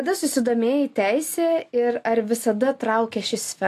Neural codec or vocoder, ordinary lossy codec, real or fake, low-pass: none; AAC, 64 kbps; real; 14.4 kHz